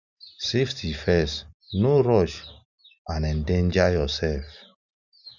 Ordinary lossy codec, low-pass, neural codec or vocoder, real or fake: none; 7.2 kHz; none; real